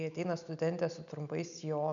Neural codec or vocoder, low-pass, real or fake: none; 7.2 kHz; real